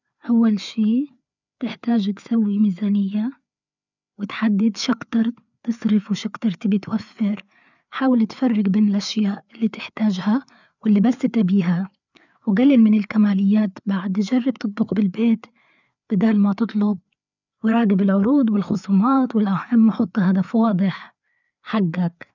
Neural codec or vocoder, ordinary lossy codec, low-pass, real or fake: codec, 16 kHz, 4 kbps, FreqCodec, larger model; none; 7.2 kHz; fake